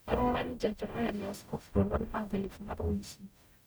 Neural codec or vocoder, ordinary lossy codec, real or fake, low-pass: codec, 44.1 kHz, 0.9 kbps, DAC; none; fake; none